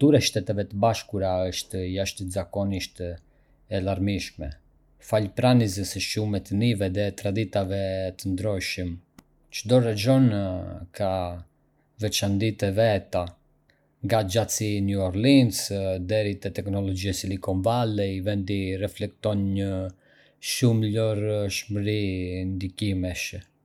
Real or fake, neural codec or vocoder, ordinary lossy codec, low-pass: real; none; none; 19.8 kHz